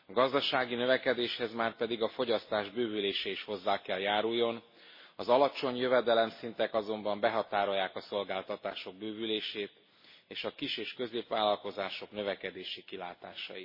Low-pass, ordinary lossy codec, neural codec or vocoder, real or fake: 5.4 kHz; MP3, 24 kbps; none; real